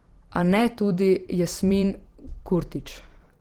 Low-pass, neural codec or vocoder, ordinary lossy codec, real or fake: 19.8 kHz; vocoder, 44.1 kHz, 128 mel bands every 512 samples, BigVGAN v2; Opus, 16 kbps; fake